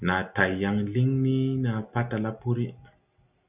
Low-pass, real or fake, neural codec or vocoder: 3.6 kHz; real; none